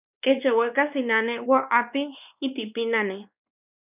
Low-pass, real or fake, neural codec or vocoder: 3.6 kHz; fake; codec, 16 kHz, 2 kbps, X-Codec, WavLM features, trained on Multilingual LibriSpeech